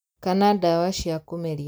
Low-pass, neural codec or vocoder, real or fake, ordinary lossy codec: none; none; real; none